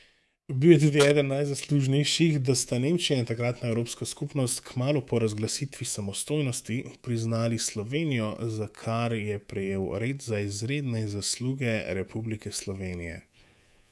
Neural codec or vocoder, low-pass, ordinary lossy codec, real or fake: codec, 24 kHz, 3.1 kbps, DualCodec; none; none; fake